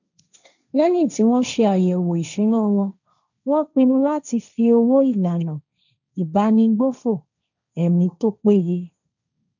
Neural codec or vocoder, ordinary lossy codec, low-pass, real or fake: codec, 16 kHz, 1.1 kbps, Voila-Tokenizer; none; 7.2 kHz; fake